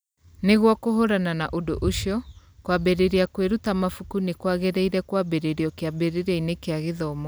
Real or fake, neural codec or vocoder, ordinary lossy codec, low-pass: real; none; none; none